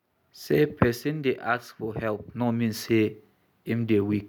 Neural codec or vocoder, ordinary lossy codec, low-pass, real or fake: none; none; 19.8 kHz; real